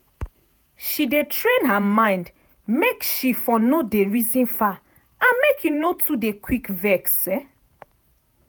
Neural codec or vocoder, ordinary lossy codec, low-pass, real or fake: vocoder, 48 kHz, 128 mel bands, Vocos; none; none; fake